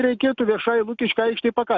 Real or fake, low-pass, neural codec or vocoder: real; 7.2 kHz; none